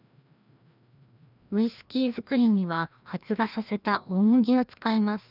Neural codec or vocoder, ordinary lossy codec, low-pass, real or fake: codec, 16 kHz, 1 kbps, FreqCodec, larger model; none; 5.4 kHz; fake